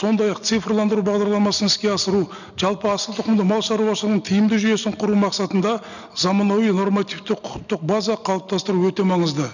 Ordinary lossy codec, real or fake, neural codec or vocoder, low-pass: none; real; none; 7.2 kHz